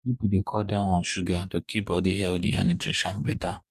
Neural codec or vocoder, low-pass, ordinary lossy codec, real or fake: codec, 44.1 kHz, 2.6 kbps, DAC; 14.4 kHz; none; fake